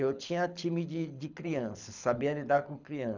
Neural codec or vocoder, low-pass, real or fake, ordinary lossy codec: codec, 24 kHz, 6 kbps, HILCodec; 7.2 kHz; fake; none